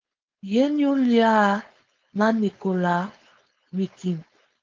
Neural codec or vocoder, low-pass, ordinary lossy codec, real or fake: codec, 16 kHz, 4.8 kbps, FACodec; 7.2 kHz; Opus, 24 kbps; fake